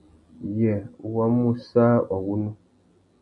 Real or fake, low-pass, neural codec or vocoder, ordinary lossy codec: real; 10.8 kHz; none; AAC, 64 kbps